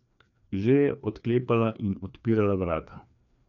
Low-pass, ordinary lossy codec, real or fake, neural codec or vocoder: 7.2 kHz; none; fake; codec, 16 kHz, 2 kbps, FreqCodec, larger model